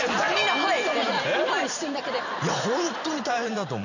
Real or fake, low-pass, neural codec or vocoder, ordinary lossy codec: fake; 7.2 kHz; vocoder, 44.1 kHz, 128 mel bands every 512 samples, BigVGAN v2; none